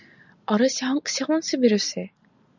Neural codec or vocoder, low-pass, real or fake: none; 7.2 kHz; real